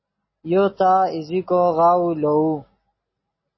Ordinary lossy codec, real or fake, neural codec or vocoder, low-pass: MP3, 24 kbps; real; none; 7.2 kHz